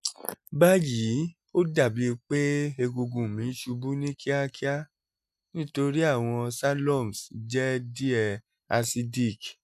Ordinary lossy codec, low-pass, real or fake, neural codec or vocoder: none; 14.4 kHz; real; none